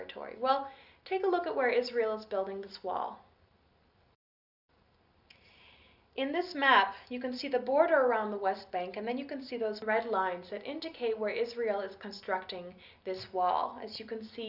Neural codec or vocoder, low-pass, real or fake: none; 5.4 kHz; real